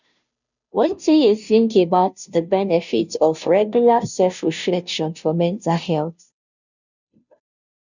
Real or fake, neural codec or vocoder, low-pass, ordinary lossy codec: fake; codec, 16 kHz, 0.5 kbps, FunCodec, trained on Chinese and English, 25 frames a second; 7.2 kHz; none